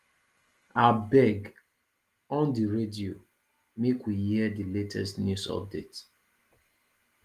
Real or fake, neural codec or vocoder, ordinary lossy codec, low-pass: real; none; Opus, 24 kbps; 14.4 kHz